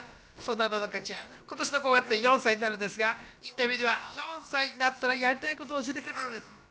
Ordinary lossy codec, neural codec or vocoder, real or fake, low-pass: none; codec, 16 kHz, about 1 kbps, DyCAST, with the encoder's durations; fake; none